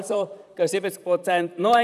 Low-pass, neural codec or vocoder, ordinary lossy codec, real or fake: 14.4 kHz; vocoder, 44.1 kHz, 128 mel bands, Pupu-Vocoder; none; fake